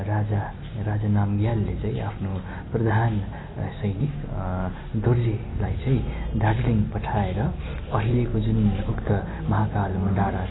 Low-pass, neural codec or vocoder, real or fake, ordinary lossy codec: 7.2 kHz; none; real; AAC, 16 kbps